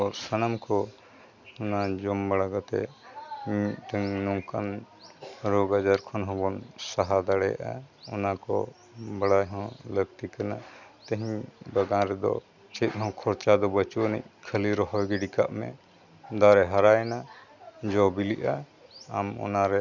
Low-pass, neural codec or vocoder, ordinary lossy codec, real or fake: 7.2 kHz; none; none; real